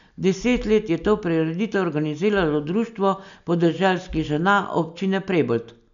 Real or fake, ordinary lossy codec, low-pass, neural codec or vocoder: real; none; 7.2 kHz; none